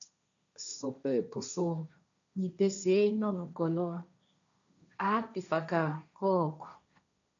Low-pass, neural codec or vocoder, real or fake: 7.2 kHz; codec, 16 kHz, 1.1 kbps, Voila-Tokenizer; fake